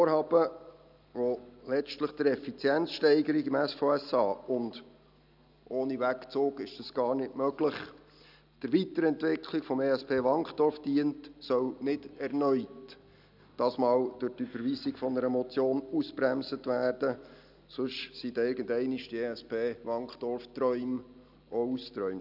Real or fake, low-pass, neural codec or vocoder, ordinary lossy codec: real; 5.4 kHz; none; none